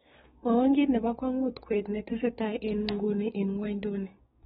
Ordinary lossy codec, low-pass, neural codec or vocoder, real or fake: AAC, 16 kbps; 7.2 kHz; codec, 16 kHz, 6 kbps, DAC; fake